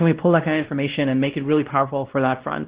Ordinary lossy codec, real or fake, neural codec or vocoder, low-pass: Opus, 16 kbps; fake; codec, 16 kHz, 1 kbps, X-Codec, WavLM features, trained on Multilingual LibriSpeech; 3.6 kHz